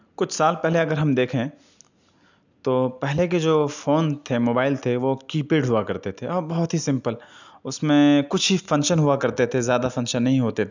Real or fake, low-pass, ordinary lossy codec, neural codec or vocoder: real; 7.2 kHz; none; none